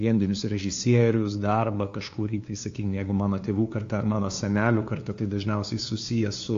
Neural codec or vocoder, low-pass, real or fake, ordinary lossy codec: codec, 16 kHz, 2 kbps, FunCodec, trained on LibriTTS, 25 frames a second; 7.2 kHz; fake; AAC, 48 kbps